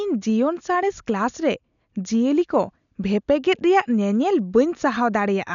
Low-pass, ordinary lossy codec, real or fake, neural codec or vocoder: 7.2 kHz; none; real; none